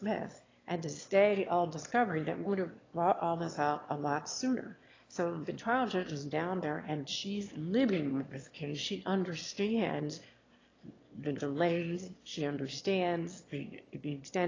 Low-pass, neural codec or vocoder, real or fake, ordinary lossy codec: 7.2 kHz; autoencoder, 22.05 kHz, a latent of 192 numbers a frame, VITS, trained on one speaker; fake; AAC, 32 kbps